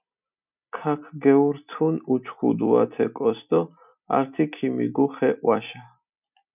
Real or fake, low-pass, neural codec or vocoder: real; 3.6 kHz; none